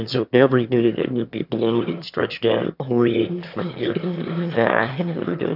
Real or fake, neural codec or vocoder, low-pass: fake; autoencoder, 22.05 kHz, a latent of 192 numbers a frame, VITS, trained on one speaker; 5.4 kHz